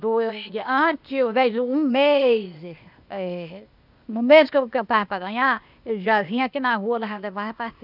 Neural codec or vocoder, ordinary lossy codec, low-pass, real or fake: codec, 16 kHz, 0.8 kbps, ZipCodec; none; 5.4 kHz; fake